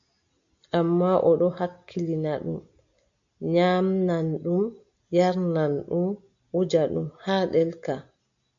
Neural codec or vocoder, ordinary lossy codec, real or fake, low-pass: none; AAC, 64 kbps; real; 7.2 kHz